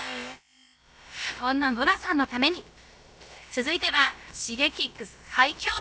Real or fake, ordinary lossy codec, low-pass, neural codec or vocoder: fake; none; none; codec, 16 kHz, about 1 kbps, DyCAST, with the encoder's durations